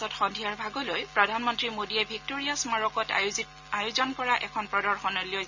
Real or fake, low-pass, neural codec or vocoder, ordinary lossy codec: real; 7.2 kHz; none; none